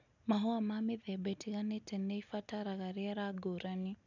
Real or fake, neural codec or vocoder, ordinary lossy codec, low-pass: real; none; none; 7.2 kHz